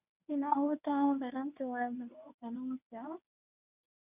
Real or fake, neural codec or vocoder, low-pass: fake; codec, 24 kHz, 0.9 kbps, WavTokenizer, medium speech release version 1; 3.6 kHz